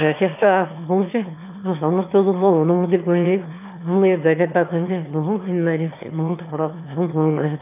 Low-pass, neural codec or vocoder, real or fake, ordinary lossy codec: 3.6 kHz; autoencoder, 22.05 kHz, a latent of 192 numbers a frame, VITS, trained on one speaker; fake; none